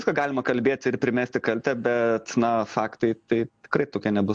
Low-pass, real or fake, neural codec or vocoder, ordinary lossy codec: 9.9 kHz; real; none; MP3, 64 kbps